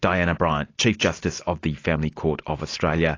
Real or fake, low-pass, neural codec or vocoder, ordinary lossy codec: real; 7.2 kHz; none; AAC, 32 kbps